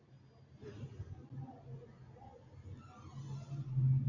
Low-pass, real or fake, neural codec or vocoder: 7.2 kHz; real; none